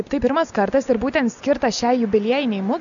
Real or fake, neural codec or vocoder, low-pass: real; none; 7.2 kHz